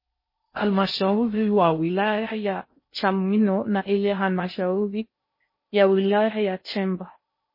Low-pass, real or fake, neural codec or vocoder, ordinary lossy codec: 5.4 kHz; fake; codec, 16 kHz in and 24 kHz out, 0.6 kbps, FocalCodec, streaming, 4096 codes; MP3, 24 kbps